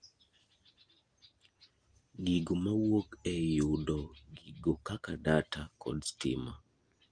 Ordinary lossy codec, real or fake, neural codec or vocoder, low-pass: Opus, 32 kbps; real; none; 9.9 kHz